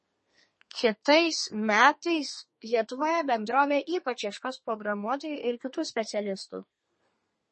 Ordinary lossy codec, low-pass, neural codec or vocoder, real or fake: MP3, 32 kbps; 10.8 kHz; codec, 32 kHz, 1.9 kbps, SNAC; fake